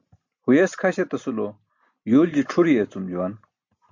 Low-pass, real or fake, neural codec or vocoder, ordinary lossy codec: 7.2 kHz; real; none; MP3, 64 kbps